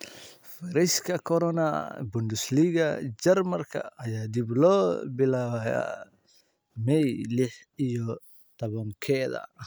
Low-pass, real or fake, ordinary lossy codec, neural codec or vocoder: none; real; none; none